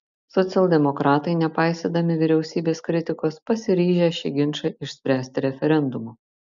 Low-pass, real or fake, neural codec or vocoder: 7.2 kHz; real; none